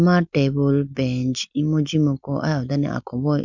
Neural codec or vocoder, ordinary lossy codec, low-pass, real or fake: none; none; 7.2 kHz; real